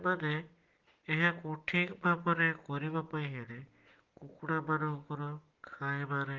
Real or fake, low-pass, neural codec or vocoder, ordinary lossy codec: real; 7.2 kHz; none; Opus, 16 kbps